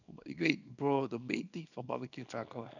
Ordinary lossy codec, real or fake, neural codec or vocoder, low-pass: none; fake; codec, 24 kHz, 0.9 kbps, WavTokenizer, small release; 7.2 kHz